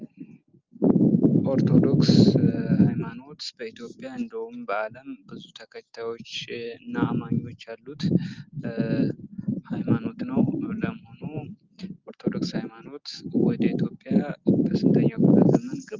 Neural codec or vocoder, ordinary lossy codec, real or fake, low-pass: none; Opus, 32 kbps; real; 7.2 kHz